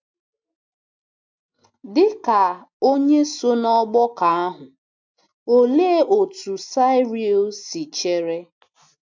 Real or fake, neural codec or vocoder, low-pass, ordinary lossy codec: real; none; 7.2 kHz; MP3, 64 kbps